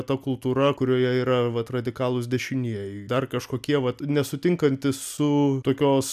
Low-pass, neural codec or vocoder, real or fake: 14.4 kHz; none; real